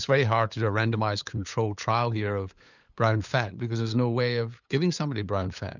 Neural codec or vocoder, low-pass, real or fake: codec, 16 kHz, 8 kbps, FunCodec, trained on LibriTTS, 25 frames a second; 7.2 kHz; fake